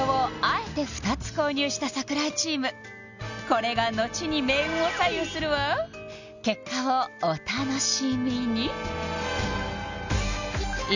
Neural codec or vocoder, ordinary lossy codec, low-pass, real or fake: none; none; 7.2 kHz; real